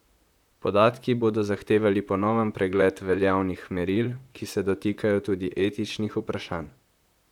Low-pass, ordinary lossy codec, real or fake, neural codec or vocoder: 19.8 kHz; none; fake; vocoder, 44.1 kHz, 128 mel bands, Pupu-Vocoder